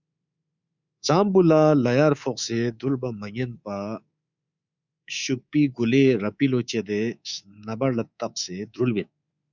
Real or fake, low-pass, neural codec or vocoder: fake; 7.2 kHz; codec, 24 kHz, 3.1 kbps, DualCodec